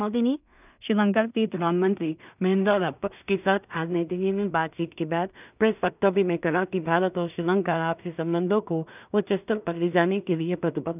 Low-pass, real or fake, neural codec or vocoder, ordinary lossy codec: 3.6 kHz; fake; codec, 16 kHz in and 24 kHz out, 0.4 kbps, LongCat-Audio-Codec, two codebook decoder; none